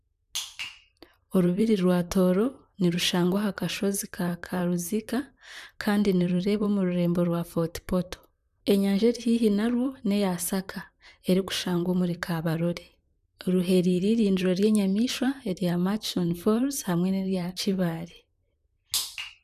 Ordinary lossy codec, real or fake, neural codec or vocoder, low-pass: none; fake; vocoder, 44.1 kHz, 128 mel bands, Pupu-Vocoder; 14.4 kHz